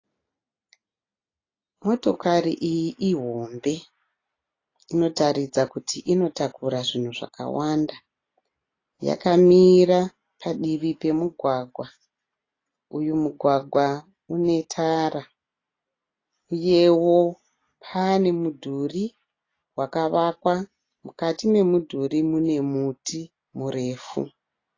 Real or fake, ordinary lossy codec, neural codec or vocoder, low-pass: real; AAC, 32 kbps; none; 7.2 kHz